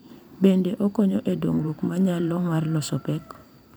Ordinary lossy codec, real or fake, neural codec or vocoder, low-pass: none; fake; vocoder, 44.1 kHz, 128 mel bands every 512 samples, BigVGAN v2; none